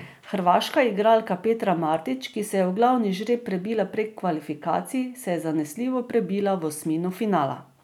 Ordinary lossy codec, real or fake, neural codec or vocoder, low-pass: none; real; none; 19.8 kHz